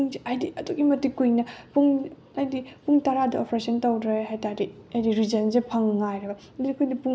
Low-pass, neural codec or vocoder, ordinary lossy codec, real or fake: none; none; none; real